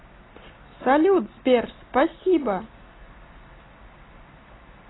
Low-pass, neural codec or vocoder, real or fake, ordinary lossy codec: 7.2 kHz; none; real; AAC, 16 kbps